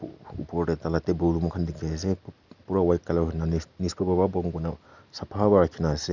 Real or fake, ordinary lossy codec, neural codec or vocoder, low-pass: real; none; none; 7.2 kHz